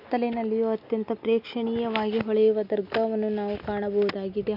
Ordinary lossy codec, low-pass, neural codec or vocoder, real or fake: MP3, 48 kbps; 5.4 kHz; none; real